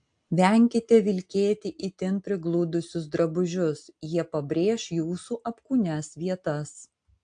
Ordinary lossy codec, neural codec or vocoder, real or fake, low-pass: AAC, 64 kbps; none; real; 9.9 kHz